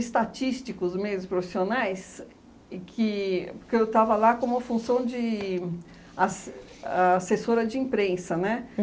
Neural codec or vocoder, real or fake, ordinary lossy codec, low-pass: none; real; none; none